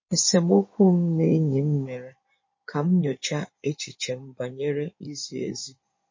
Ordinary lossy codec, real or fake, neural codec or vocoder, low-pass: MP3, 32 kbps; fake; codec, 16 kHz in and 24 kHz out, 2.2 kbps, FireRedTTS-2 codec; 7.2 kHz